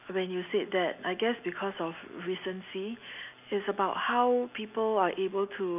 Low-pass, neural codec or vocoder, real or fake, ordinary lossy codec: 3.6 kHz; none; real; none